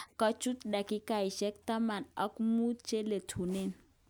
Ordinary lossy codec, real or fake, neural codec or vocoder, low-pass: none; real; none; none